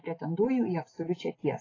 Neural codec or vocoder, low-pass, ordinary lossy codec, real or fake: none; 7.2 kHz; AAC, 32 kbps; real